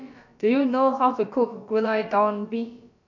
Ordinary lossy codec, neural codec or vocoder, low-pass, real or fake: none; codec, 16 kHz, about 1 kbps, DyCAST, with the encoder's durations; 7.2 kHz; fake